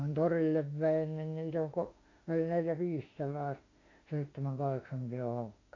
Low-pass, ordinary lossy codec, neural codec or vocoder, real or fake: 7.2 kHz; AAC, 32 kbps; autoencoder, 48 kHz, 32 numbers a frame, DAC-VAE, trained on Japanese speech; fake